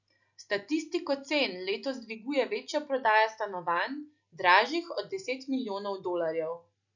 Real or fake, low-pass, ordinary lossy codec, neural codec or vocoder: real; 7.2 kHz; none; none